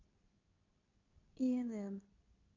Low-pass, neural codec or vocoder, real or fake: 7.2 kHz; codec, 24 kHz, 0.9 kbps, WavTokenizer, medium speech release version 2; fake